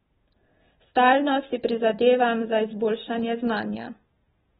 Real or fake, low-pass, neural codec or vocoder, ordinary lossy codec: real; 7.2 kHz; none; AAC, 16 kbps